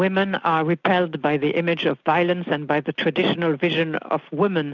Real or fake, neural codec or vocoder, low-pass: real; none; 7.2 kHz